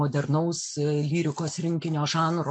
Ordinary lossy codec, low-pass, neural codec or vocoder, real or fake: MP3, 64 kbps; 9.9 kHz; none; real